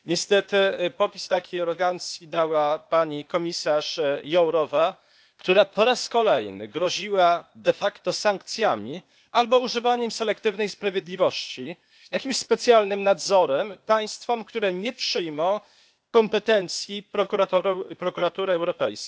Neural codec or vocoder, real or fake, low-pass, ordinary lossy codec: codec, 16 kHz, 0.8 kbps, ZipCodec; fake; none; none